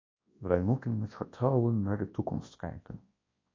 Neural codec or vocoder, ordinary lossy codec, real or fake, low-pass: codec, 24 kHz, 0.9 kbps, WavTokenizer, large speech release; AAC, 32 kbps; fake; 7.2 kHz